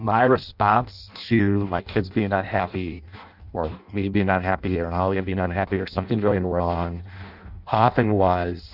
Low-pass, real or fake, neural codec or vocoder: 5.4 kHz; fake; codec, 16 kHz in and 24 kHz out, 0.6 kbps, FireRedTTS-2 codec